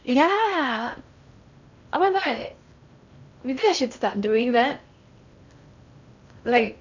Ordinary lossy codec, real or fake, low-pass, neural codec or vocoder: none; fake; 7.2 kHz; codec, 16 kHz in and 24 kHz out, 0.6 kbps, FocalCodec, streaming, 4096 codes